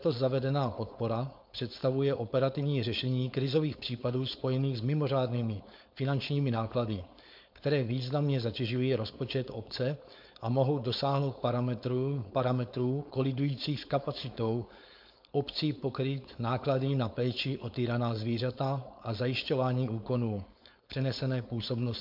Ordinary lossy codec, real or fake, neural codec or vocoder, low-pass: MP3, 48 kbps; fake; codec, 16 kHz, 4.8 kbps, FACodec; 5.4 kHz